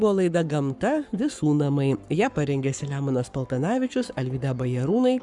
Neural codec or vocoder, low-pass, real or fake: codec, 44.1 kHz, 7.8 kbps, Pupu-Codec; 10.8 kHz; fake